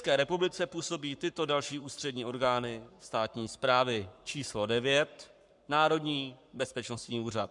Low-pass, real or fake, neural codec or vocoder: 10.8 kHz; fake; codec, 44.1 kHz, 7.8 kbps, Pupu-Codec